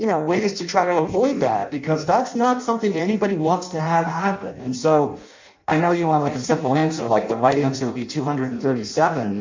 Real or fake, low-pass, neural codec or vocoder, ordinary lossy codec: fake; 7.2 kHz; codec, 16 kHz in and 24 kHz out, 0.6 kbps, FireRedTTS-2 codec; MP3, 64 kbps